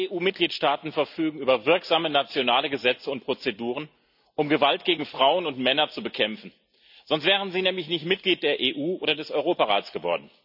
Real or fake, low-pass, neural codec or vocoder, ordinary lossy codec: real; 5.4 kHz; none; none